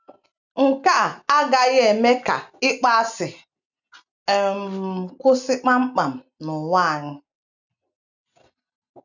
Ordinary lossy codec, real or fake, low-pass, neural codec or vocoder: none; real; 7.2 kHz; none